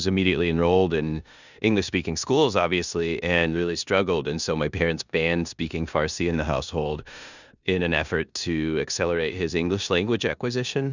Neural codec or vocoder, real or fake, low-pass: codec, 16 kHz in and 24 kHz out, 0.9 kbps, LongCat-Audio-Codec, four codebook decoder; fake; 7.2 kHz